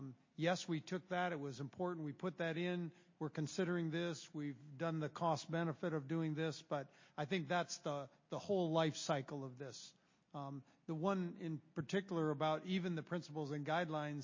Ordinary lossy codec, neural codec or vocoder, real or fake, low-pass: MP3, 32 kbps; none; real; 7.2 kHz